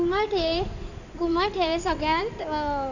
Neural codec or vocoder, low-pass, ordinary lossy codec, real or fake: codec, 16 kHz in and 24 kHz out, 2.2 kbps, FireRedTTS-2 codec; 7.2 kHz; none; fake